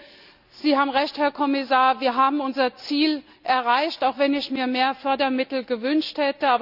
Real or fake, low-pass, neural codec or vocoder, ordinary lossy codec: real; 5.4 kHz; none; none